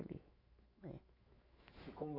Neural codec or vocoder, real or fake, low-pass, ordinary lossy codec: autoencoder, 48 kHz, 128 numbers a frame, DAC-VAE, trained on Japanese speech; fake; 5.4 kHz; none